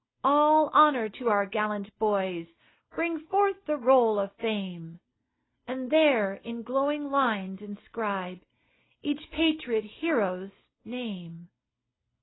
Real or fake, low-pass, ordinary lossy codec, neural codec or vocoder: real; 7.2 kHz; AAC, 16 kbps; none